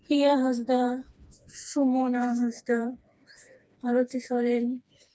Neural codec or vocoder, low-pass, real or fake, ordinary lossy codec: codec, 16 kHz, 2 kbps, FreqCodec, smaller model; none; fake; none